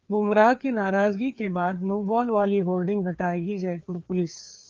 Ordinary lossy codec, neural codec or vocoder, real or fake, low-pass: Opus, 32 kbps; codec, 16 kHz, 2 kbps, FreqCodec, larger model; fake; 7.2 kHz